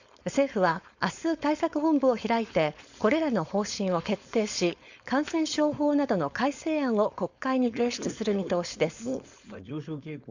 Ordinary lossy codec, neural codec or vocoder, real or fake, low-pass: Opus, 64 kbps; codec, 16 kHz, 4.8 kbps, FACodec; fake; 7.2 kHz